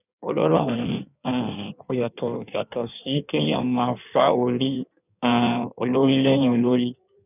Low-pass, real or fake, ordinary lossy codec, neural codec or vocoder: 3.6 kHz; fake; none; codec, 16 kHz in and 24 kHz out, 1.1 kbps, FireRedTTS-2 codec